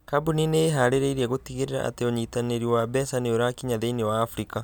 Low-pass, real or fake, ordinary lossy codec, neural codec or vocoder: none; real; none; none